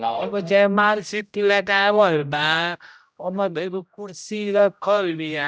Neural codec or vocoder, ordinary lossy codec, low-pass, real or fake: codec, 16 kHz, 0.5 kbps, X-Codec, HuBERT features, trained on general audio; none; none; fake